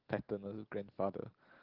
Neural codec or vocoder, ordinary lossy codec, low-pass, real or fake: none; Opus, 16 kbps; 5.4 kHz; real